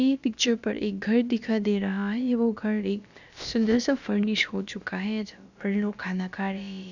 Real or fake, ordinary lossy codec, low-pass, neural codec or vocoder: fake; none; 7.2 kHz; codec, 16 kHz, about 1 kbps, DyCAST, with the encoder's durations